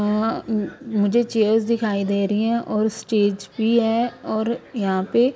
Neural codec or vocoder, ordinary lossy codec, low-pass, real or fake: none; none; none; real